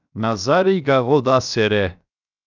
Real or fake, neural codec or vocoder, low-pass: fake; codec, 16 kHz, 0.7 kbps, FocalCodec; 7.2 kHz